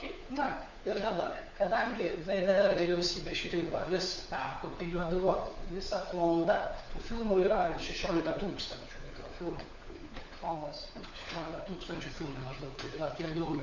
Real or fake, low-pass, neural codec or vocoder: fake; 7.2 kHz; codec, 16 kHz, 4 kbps, FunCodec, trained on LibriTTS, 50 frames a second